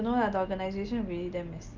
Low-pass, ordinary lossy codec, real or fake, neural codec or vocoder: 7.2 kHz; Opus, 32 kbps; real; none